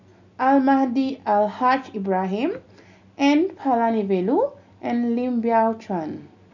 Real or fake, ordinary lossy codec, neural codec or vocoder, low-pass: real; none; none; 7.2 kHz